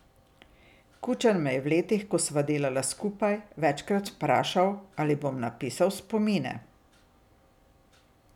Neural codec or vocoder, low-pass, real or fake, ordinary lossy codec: none; 19.8 kHz; real; none